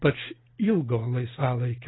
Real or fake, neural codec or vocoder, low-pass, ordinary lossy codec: real; none; 7.2 kHz; AAC, 16 kbps